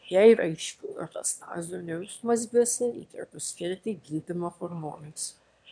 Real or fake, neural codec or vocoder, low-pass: fake; autoencoder, 22.05 kHz, a latent of 192 numbers a frame, VITS, trained on one speaker; 9.9 kHz